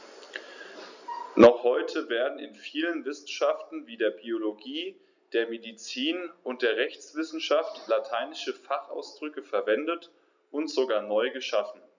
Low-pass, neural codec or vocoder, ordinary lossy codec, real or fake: 7.2 kHz; none; none; real